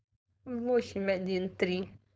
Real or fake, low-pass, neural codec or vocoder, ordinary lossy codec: fake; none; codec, 16 kHz, 4.8 kbps, FACodec; none